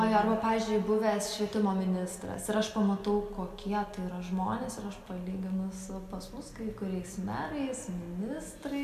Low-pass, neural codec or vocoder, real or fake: 14.4 kHz; none; real